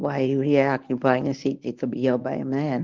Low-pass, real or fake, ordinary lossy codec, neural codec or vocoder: 7.2 kHz; fake; Opus, 32 kbps; codec, 24 kHz, 0.9 kbps, WavTokenizer, medium speech release version 1